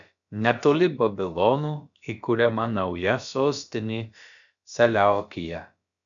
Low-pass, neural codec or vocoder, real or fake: 7.2 kHz; codec, 16 kHz, about 1 kbps, DyCAST, with the encoder's durations; fake